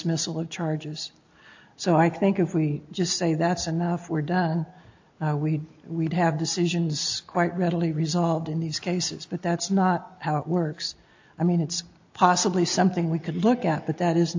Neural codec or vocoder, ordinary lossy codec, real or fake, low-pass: none; AAC, 48 kbps; real; 7.2 kHz